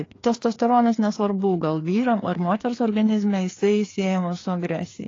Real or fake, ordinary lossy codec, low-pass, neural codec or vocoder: fake; AAC, 32 kbps; 7.2 kHz; codec, 16 kHz, 2 kbps, FreqCodec, larger model